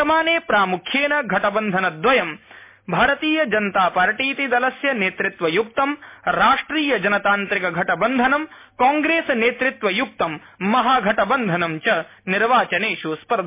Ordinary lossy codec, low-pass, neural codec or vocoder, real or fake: MP3, 24 kbps; 3.6 kHz; none; real